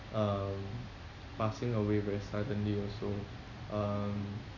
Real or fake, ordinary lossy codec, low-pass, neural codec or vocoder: real; none; 7.2 kHz; none